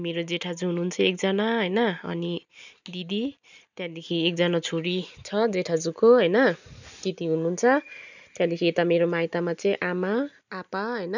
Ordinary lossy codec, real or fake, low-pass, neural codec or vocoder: none; real; 7.2 kHz; none